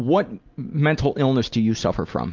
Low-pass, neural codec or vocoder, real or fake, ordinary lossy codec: 7.2 kHz; none; real; Opus, 24 kbps